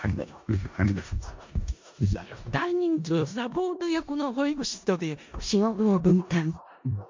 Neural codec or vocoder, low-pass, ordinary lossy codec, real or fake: codec, 16 kHz in and 24 kHz out, 0.4 kbps, LongCat-Audio-Codec, four codebook decoder; 7.2 kHz; MP3, 48 kbps; fake